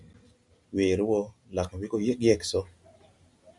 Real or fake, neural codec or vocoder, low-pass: real; none; 10.8 kHz